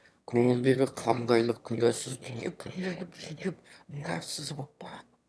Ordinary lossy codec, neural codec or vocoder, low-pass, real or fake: none; autoencoder, 22.05 kHz, a latent of 192 numbers a frame, VITS, trained on one speaker; none; fake